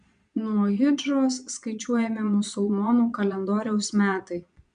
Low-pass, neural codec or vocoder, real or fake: 9.9 kHz; vocoder, 22.05 kHz, 80 mel bands, Vocos; fake